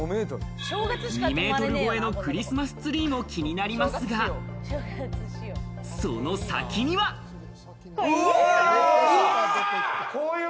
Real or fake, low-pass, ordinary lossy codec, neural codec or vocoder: real; none; none; none